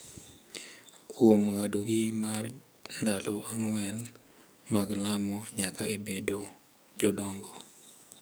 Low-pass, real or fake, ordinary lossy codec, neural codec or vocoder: none; fake; none; codec, 44.1 kHz, 2.6 kbps, SNAC